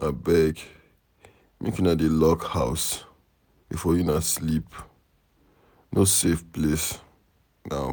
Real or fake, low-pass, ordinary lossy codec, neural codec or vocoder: real; none; none; none